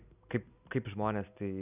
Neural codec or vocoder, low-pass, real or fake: none; 3.6 kHz; real